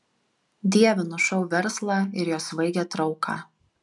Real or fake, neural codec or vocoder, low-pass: real; none; 10.8 kHz